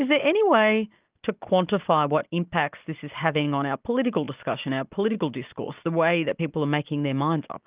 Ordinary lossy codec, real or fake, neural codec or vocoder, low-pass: Opus, 32 kbps; real; none; 3.6 kHz